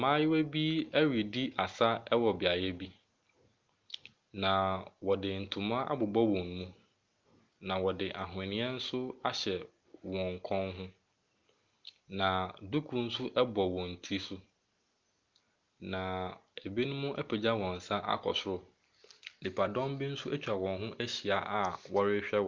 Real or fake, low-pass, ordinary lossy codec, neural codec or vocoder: real; 7.2 kHz; Opus, 24 kbps; none